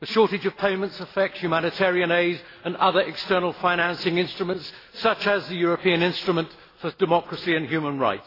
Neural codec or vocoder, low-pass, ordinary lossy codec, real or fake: none; 5.4 kHz; AAC, 24 kbps; real